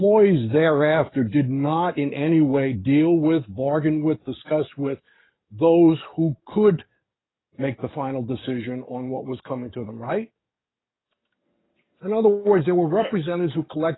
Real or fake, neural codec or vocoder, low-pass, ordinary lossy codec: fake; codec, 44.1 kHz, 7.8 kbps, DAC; 7.2 kHz; AAC, 16 kbps